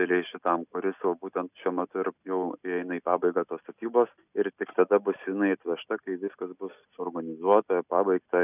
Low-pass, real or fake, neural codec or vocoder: 3.6 kHz; real; none